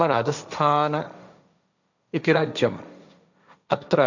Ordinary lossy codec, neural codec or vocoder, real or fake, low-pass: none; codec, 16 kHz, 1.1 kbps, Voila-Tokenizer; fake; 7.2 kHz